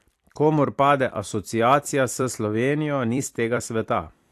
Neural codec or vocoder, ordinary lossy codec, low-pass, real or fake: vocoder, 44.1 kHz, 128 mel bands every 256 samples, BigVGAN v2; AAC, 64 kbps; 14.4 kHz; fake